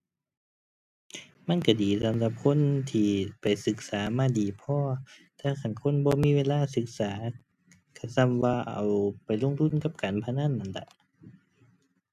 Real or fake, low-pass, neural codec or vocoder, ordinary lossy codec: real; 14.4 kHz; none; none